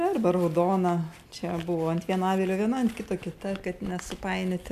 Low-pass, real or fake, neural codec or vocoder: 14.4 kHz; real; none